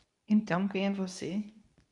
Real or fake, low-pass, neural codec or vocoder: fake; 10.8 kHz; codec, 24 kHz, 0.9 kbps, WavTokenizer, medium speech release version 2